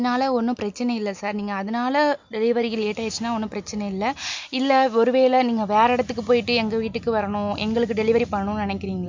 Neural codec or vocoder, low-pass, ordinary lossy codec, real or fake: none; 7.2 kHz; MP3, 64 kbps; real